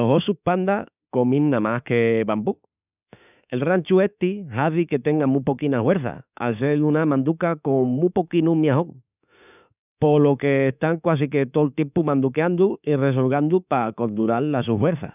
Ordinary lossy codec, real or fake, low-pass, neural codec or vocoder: none; fake; 3.6 kHz; autoencoder, 48 kHz, 32 numbers a frame, DAC-VAE, trained on Japanese speech